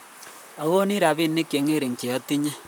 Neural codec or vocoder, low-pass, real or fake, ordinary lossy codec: vocoder, 44.1 kHz, 128 mel bands, Pupu-Vocoder; none; fake; none